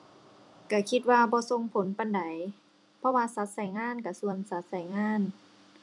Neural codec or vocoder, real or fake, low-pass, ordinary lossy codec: vocoder, 44.1 kHz, 128 mel bands every 256 samples, BigVGAN v2; fake; 10.8 kHz; none